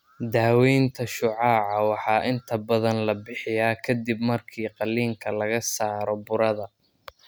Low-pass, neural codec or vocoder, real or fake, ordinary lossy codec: none; none; real; none